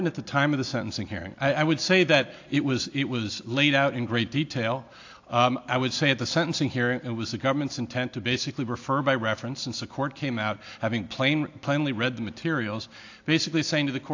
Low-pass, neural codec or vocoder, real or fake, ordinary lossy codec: 7.2 kHz; none; real; AAC, 48 kbps